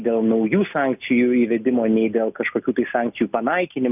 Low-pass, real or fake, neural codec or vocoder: 3.6 kHz; real; none